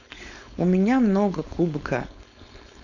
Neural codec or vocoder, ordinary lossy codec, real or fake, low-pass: codec, 16 kHz, 4.8 kbps, FACodec; none; fake; 7.2 kHz